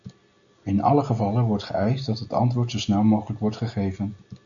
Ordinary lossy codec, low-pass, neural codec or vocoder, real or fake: MP3, 96 kbps; 7.2 kHz; none; real